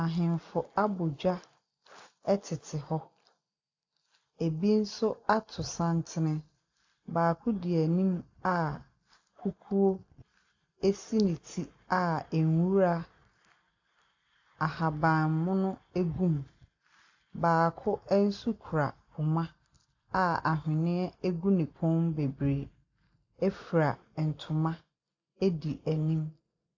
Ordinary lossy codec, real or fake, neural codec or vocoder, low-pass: AAC, 48 kbps; real; none; 7.2 kHz